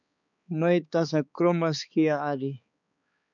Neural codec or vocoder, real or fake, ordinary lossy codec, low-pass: codec, 16 kHz, 4 kbps, X-Codec, HuBERT features, trained on balanced general audio; fake; MP3, 96 kbps; 7.2 kHz